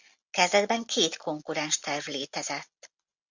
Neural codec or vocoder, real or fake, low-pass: none; real; 7.2 kHz